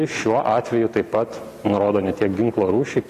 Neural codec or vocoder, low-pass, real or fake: none; 14.4 kHz; real